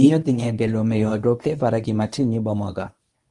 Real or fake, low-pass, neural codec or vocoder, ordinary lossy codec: fake; 10.8 kHz; codec, 24 kHz, 0.9 kbps, WavTokenizer, medium speech release version 1; Opus, 32 kbps